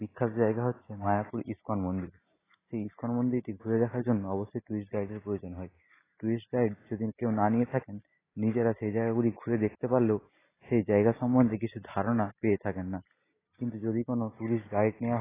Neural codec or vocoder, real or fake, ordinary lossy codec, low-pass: none; real; AAC, 16 kbps; 3.6 kHz